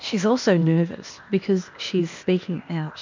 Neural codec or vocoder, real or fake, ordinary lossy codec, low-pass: codec, 16 kHz, 0.8 kbps, ZipCodec; fake; MP3, 64 kbps; 7.2 kHz